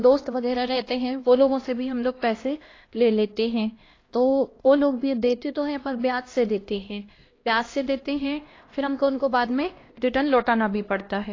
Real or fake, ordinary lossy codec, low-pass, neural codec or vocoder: fake; AAC, 32 kbps; 7.2 kHz; codec, 16 kHz, 1 kbps, X-Codec, HuBERT features, trained on LibriSpeech